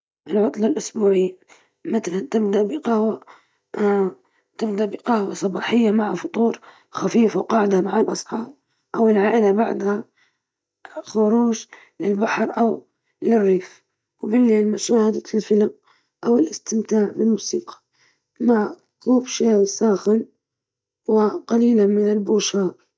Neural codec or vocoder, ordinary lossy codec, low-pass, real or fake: none; none; none; real